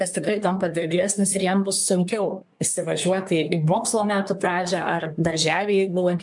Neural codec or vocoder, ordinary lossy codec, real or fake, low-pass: codec, 24 kHz, 1 kbps, SNAC; MP3, 64 kbps; fake; 10.8 kHz